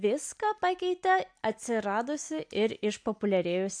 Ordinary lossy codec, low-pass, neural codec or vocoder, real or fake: AAC, 64 kbps; 9.9 kHz; none; real